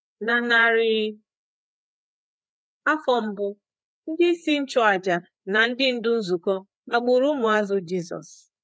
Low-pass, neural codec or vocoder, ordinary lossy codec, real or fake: none; codec, 16 kHz, 4 kbps, FreqCodec, larger model; none; fake